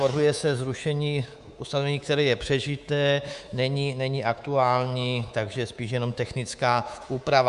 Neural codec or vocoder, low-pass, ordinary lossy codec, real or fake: codec, 24 kHz, 3.1 kbps, DualCodec; 10.8 kHz; Opus, 64 kbps; fake